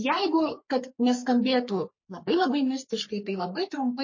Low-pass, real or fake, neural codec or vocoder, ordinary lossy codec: 7.2 kHz; fake; codec, 44.1 kHz, 3.4 kbps, Pupu-Codec; MP3, 32 kbps